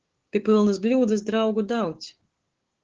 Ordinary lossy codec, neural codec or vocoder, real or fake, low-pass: Opus, 16 kbps; codec, 16 kHz, 6 kbps, DAC; fake; 7.2 kHz